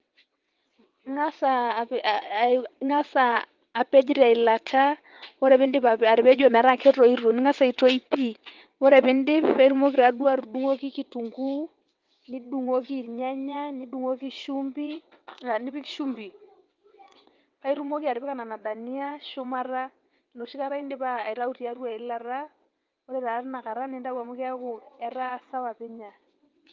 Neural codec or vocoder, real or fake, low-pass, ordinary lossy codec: vocoder, 22.05 kHz, 80 mel bands, WaveNeXt; fake; 7.2 kHz; Opus, 24 kbps